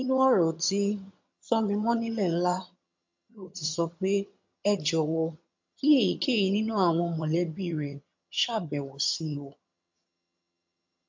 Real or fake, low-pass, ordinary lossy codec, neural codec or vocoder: fake; 7.2 kHz; MP3, 48 kbps; vocoder, 22.05 kHz, 80 mel bands, HiFi-GAN